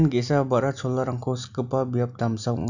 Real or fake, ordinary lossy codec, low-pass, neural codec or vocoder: real; none; 7.2 kHz; none